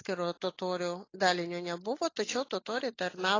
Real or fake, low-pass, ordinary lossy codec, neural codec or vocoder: real; 7.2 kHz; AAC, 32 kbps; none